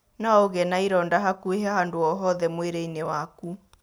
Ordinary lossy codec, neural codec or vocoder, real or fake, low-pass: none; none; real; none